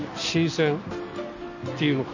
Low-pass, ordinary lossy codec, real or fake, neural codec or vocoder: 7.2 kHz; none; real; none